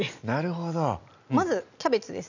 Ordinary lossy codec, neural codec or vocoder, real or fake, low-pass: none; none; real; 7.2 kHz